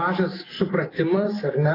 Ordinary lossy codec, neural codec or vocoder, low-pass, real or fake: AAC, 24 kbps; none; 5.4 kHz; real